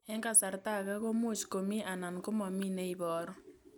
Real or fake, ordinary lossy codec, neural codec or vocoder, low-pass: real; none; none; none